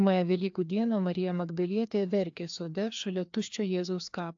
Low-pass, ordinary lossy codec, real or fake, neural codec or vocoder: 7.2 kHz; AAC, 64 kbps; fake; codec, 16 kHz, 2 kbps, FreqCodec, larger model